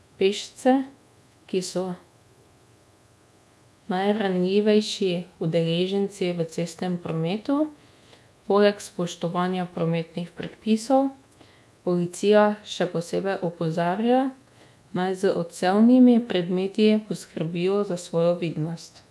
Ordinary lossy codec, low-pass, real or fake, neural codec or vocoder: none; none; fake; codec, 24 kHz, 1.2 kbps, DualCodec